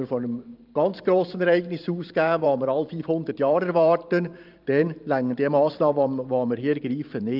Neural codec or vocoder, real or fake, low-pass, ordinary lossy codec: vocoder, 44.1 kHz, 128 mel bands every 512 samples, BigVGAN v2; fake; 5.4 kHz; Opus, 24 kbps